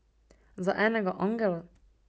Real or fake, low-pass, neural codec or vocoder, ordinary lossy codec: real; none; none; none